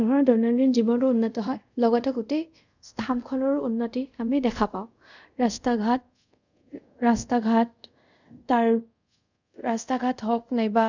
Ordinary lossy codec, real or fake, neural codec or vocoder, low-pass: none; fake; codec, 24 kHz, 0.5 kbps, DualCodec; 7.2 kHz